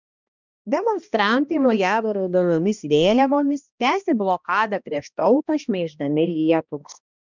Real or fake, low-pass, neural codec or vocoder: fake; 7.2 kHz; codec, 16 kHz, 1 kbps, X-Codec, HuBERT features, trained on balanced general audio